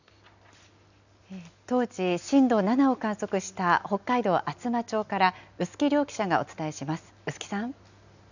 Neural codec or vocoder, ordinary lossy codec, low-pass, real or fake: none; none; 7.2 kHz; real